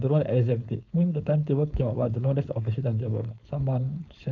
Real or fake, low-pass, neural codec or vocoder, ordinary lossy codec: fake; 7.2 kHz; codec, 16 kHz, 4.8 kbps, FACodec; none